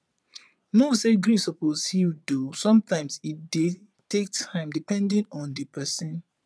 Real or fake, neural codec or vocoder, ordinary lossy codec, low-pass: fake; vocoder, 22.05 kHz, 80 mel bands, Vocos; none; none